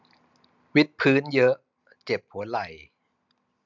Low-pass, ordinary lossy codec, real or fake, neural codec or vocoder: 7.2 kHz; none; real; none